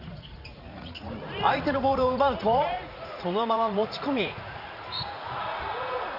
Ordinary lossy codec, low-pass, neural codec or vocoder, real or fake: none; 5.4 kHz; vocoder, 44.1 kHz, 80 mel bands, Vocos; fake